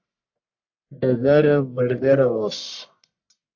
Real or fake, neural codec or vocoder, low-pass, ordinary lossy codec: fake; codec, 44.1 kHz, 1.7 kbps, Pupu-Codec; 7.2 kHz; AAC, 48 kbps